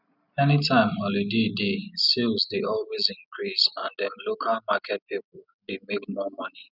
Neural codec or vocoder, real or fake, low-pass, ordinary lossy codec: none; real; 5.4 kHz; none